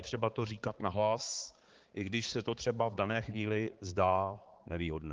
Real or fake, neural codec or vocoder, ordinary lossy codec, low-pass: fake; codec, 16 kHz, 4 kbps, X-Codec, HuBERT features, trained on balanced general audio; Opus, 16 kbps; 7.2 kHz